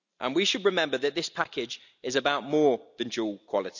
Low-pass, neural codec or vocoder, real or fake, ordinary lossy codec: 7.2 kHz; none; real; none